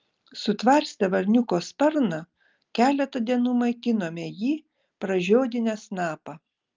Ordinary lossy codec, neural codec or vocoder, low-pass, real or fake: Opus, 24 kbps; none; 7.2 kHz; real